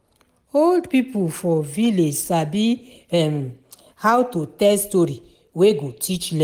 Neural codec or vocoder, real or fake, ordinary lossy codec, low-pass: none; real; Opus, 24 kbps; 19.8 kHz